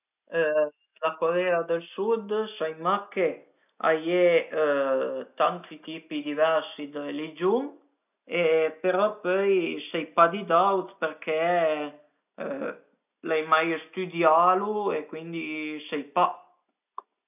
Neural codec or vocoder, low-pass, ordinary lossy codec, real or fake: none; 3.6 kHz; none; real